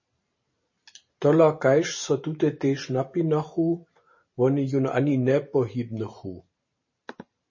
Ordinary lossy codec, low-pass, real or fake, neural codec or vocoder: MP3, 32 kbps; 7.2 kHz; fake; vocoder, 44.1 kHz, 128 mel bands every 512 samples, BigVGAN v2